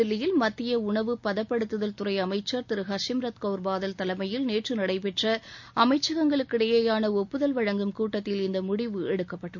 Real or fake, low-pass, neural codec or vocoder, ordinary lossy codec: real; 7.2 kHz; none; MP3, 48 kbps